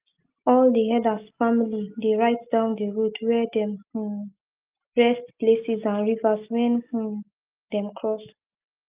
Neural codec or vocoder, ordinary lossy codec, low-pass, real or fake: none; Opus, 24 kbps; 3.6 kHz; real